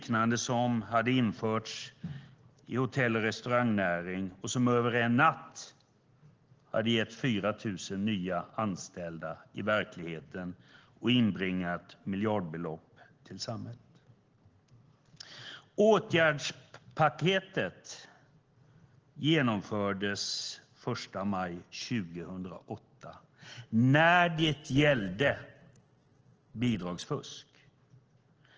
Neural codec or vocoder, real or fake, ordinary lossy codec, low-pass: none; real; Opus, 16 kbps; 7.2 kHz